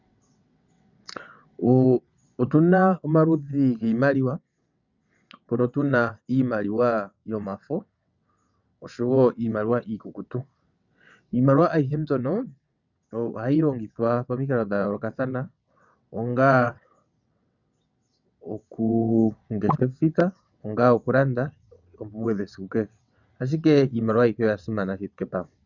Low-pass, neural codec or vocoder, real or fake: 7.2 kHz; vocoder, 22.05 kHz, 80 mel bands, WaveNeXt; fake